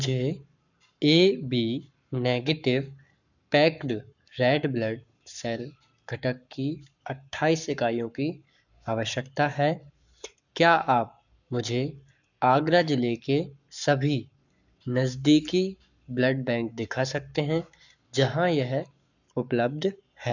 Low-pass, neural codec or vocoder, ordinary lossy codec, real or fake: 7.2 kHz; codec, 44.1 kHz, 7.8 kbps, Pupu-Codec; none; fake